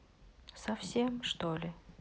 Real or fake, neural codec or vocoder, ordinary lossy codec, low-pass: real; none; none; none